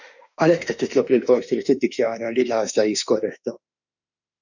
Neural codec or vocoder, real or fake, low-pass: autoencoder, 48 kHz, 32 numbers a frame, DAC-VAE, trained on Japanese speech; fake; 7.2 kHz